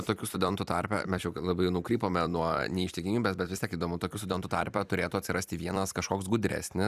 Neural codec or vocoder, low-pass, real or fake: vocoder, 44.1 kHz, 128 mel bands every 256 samples, BigVGAN v2; 14.4 kHz; fake